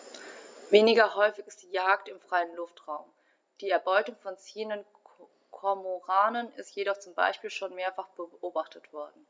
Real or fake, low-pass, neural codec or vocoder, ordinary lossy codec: real; none; none; none